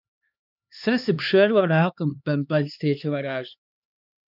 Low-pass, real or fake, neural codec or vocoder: 5.4 kHz; fake; codec, 16 kHz, 2 kbps, X-Codec, HuBERT features, trained on LibriSpeech